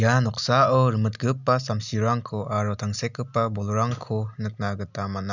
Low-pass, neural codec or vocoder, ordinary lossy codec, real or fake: 7.2 kHz; none; none; real